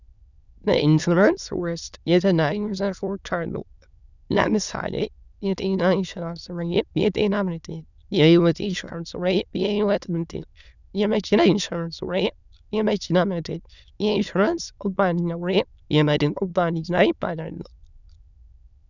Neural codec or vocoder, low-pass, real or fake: autoencoder, 22.05 kHz, a latent of 192 numbers a frame, VITS, trained on many speakers; 7.2 kHz; fake